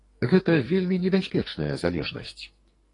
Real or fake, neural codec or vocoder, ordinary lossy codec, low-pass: fake; codec, 44.1 kHz, 2.6 kbps, SNAC; AAC, 32 kbps; 10.8 kHz